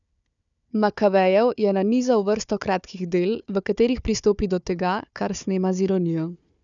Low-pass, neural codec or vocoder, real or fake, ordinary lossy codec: 7.2 kHz; codec, 16 kHz, 4 kbps, FunCodec, trained on Chinese and English, 50 frames a second; fake; none